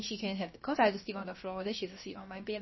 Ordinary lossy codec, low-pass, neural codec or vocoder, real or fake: MP3, 24 kbps; 7.2 kHz; codec, 16 kHz, about 1 kbps, DyCAST, with the encoder's durations; fake